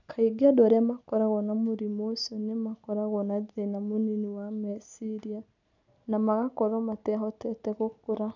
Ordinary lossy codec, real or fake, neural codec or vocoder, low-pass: none; real; none; 7.2 kHz